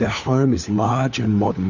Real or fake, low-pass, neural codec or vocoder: fake; 7.2 kHz; codec, 16 kHz, 4 kbps, FunCodec, trained on LibriTTS, 50 frames a second